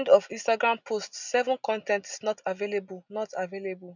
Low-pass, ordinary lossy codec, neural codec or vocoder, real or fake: 7.2 kHz; none; none; real